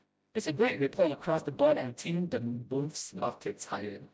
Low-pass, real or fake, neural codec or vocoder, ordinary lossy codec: none; fake; codec, 16 kHz, 0.5 kbps, FreqCodec, smaller model; none